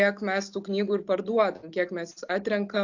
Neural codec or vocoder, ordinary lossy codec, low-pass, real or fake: none; AAC, 48 kbps; 7.2 kHz; real